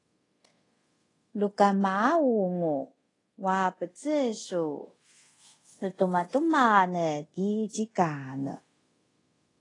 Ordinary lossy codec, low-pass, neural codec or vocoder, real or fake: AAC, 32 kbps; 10.8 kHz; codec, 24 kHz, 0.5 kbps, DualCodec; fake